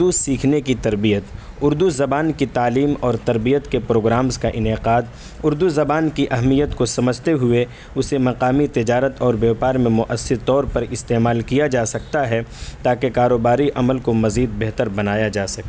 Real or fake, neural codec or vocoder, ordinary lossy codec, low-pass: real; none; none; none